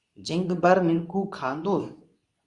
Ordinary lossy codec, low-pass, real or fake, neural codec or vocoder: Opus, 64 kbps; 10.8 kHz; fake; codec, 24 kHz, 0.9 kbps, WavTokenizer, medium speech release version 2